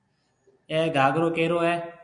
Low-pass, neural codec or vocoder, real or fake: 9.9 kHz; none; real